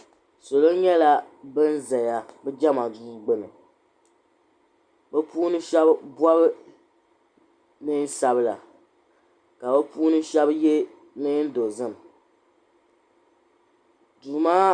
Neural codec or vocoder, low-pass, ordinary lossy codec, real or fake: none; 9.9 kHz; Opus, 64 kbps; real